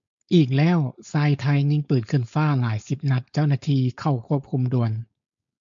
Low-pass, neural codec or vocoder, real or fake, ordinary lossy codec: 7.2 kHz; codec, 16 kHz, 4.8 kbps, FACodec; fake; MP3, 64 kbps